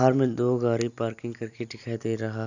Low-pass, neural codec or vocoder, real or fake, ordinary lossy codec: 7.2 kHz; none; real; none